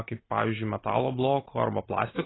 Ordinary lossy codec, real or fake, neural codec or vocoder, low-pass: AAC, 16 kbps; real; none; 7.2 kHz